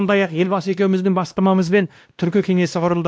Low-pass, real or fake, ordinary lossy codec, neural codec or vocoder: none; fake; none; codec, 16 kHz, 1 kbps, X-Codec, WavLM features, trained on Multilingual LibriSpeech